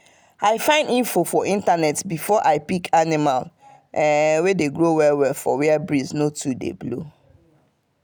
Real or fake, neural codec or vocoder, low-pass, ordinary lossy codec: real; none; none; none